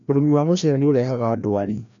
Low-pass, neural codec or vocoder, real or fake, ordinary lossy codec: 7.2 kHz; codec, 16 kHz, 1 kbps, FreqCodec, larger model; fake; MP3, 96 kbps